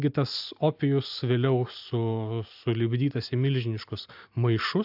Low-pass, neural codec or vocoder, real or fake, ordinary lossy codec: 5.4 kHz; none; real; AAC, 48 kbps